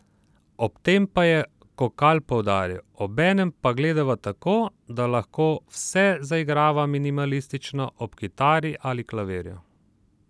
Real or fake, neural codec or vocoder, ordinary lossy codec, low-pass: real; none; none; none